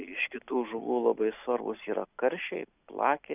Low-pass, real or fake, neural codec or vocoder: 3.6 kHz; real; none